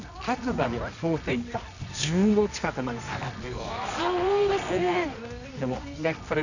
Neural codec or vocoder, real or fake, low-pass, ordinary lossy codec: codec, 24 kHz, 0.9 kbps, WavTokenizer, medium music audio release; fake; 7.2 kHz; none